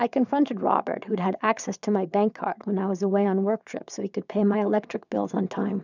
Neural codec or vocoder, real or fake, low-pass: vocoder, 22.05 kHz, 80 mel bands, WaveNeXt; fake; 7.2 kHz